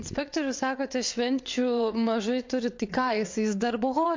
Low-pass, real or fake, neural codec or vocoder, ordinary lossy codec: 7.2 kHz; fake; vocoder, 22.05 kHz, 80 mel bands, WaveNeXt; MP3, 48 kbps